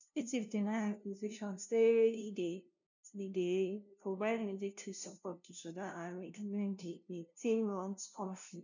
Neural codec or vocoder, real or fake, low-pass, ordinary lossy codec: codec, 16 kHz, 0.5 kbps, FunCodec, trained on LibriTTS, 25 frames a second; fake; 7.2 kHz; none